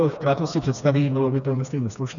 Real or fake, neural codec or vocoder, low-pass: fake; codec, 16 kHz, 2 kbps, FreqCodec, smaller model; 7.2 kHz